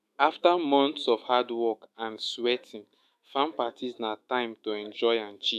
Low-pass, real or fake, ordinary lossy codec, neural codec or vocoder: 14.4 kHz; fake; none; autoencoder, 48 kHz, 128 numbers a frame, DAC-VAE, trained on Japanese speech